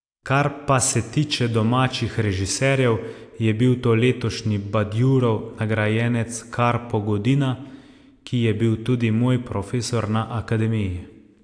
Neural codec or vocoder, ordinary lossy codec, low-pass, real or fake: none; AAC, 64 kbps; 9.9 kHz; real